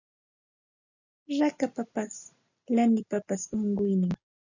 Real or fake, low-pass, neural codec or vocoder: real; 7.2 kHz; none